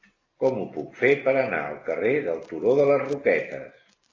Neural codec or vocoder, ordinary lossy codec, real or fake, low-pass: none; AAC, 32 kbps; real; 7.2 kHz